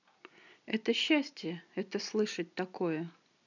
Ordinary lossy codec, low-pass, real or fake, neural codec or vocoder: none; 7.2 kHz; real; none